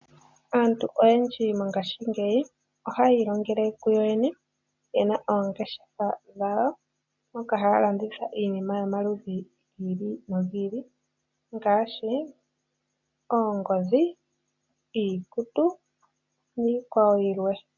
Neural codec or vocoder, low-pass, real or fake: none; 7.2 kHz; real